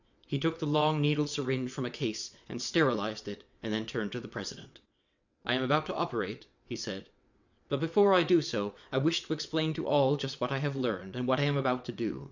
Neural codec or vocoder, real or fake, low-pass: vocoder, 22.05 kHz, 80 mel bands, WaveNeXt; fake; 7.2 kHz